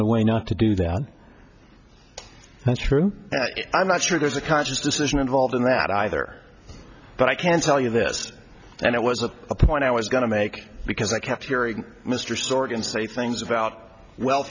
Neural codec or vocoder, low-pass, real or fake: none; 7.2 kHz; real